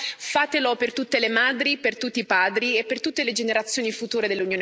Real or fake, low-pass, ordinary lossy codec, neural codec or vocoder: real; none; none; none